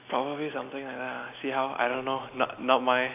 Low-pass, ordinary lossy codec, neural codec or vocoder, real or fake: 3.6 kHz; AAC, 32 kbps; none; real